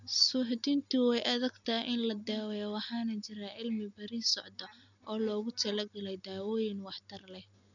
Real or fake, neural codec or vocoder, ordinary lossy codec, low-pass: real; none; none; 7.2 kHz